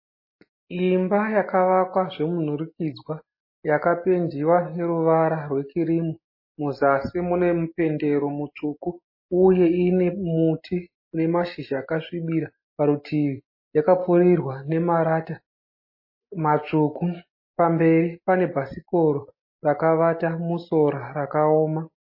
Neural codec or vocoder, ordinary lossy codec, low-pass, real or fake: none; MP3, 24 kbps; 5.4 kHz; real